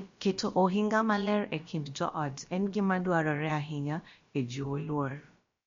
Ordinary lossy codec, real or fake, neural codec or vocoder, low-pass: MP3, 48 kbps; fake; codec, 16 kHz, about 1 kbps, DyCAST, with the encoder's durations; 7.2 kHz